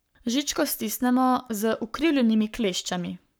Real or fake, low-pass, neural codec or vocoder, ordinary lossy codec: fake; none; codec, 44.1 kHz, 7.8 kbps, Pupu-Codec; none